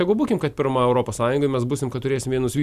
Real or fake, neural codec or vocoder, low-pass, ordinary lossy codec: real; none; 14.4 kHz; Opus, 64 kbps